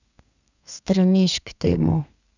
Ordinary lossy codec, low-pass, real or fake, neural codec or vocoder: none; 7.2 kHz; fake; codec, 32 kHz, 1.9 kbps, SNAC